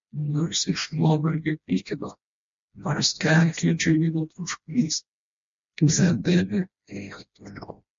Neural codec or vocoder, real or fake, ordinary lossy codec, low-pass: codec, 16 kHz, 1 kbps, FreqCodec, smaller model; fake; MP3, 48 kbps; 7.2 kHz